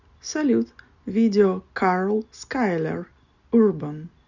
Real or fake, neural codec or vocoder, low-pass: real; none; 7.2 kHz